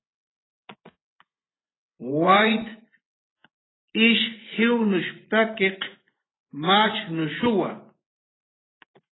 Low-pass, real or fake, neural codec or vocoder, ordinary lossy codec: 7.2 kHz; fake; vocoder, 24 kHz, 100 mel bands, Vocos; AAC, 16 kbps